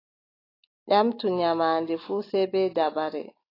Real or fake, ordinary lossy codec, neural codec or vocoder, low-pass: real; AAC, 24 kbps; none; 5.4 kHz